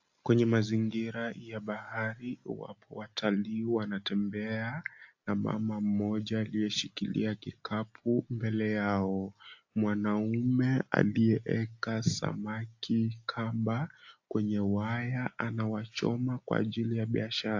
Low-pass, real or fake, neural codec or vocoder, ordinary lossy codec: 7.2 kHz; real; none; AAC, 48 kbps